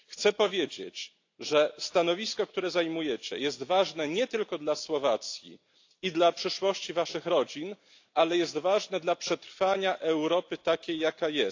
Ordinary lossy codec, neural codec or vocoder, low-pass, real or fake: AAC, 48 kbps; none; 7.2 kHz; real